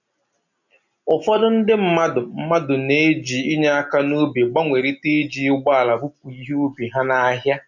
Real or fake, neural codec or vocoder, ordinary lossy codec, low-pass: real; none; none; 7.2 kHz